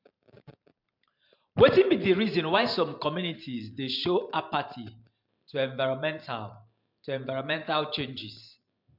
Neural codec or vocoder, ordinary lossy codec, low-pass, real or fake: vocoder, 44.1 kHz, 128 mel bands every 512 samples, BigVGAN v2; MP3, 48 kbps; 5.4 kHz; fake